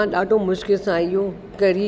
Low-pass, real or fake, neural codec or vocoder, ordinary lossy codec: none; real; none; none